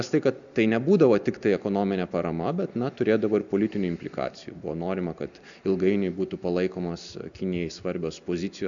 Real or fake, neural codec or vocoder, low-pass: real; none; 7.2 kHz